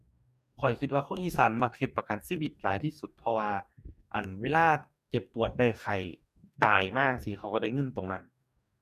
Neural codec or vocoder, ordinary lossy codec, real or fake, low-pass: codec, 44.1 kHz, 2.6 kbps, DAC; none; fake; 14.4 kHz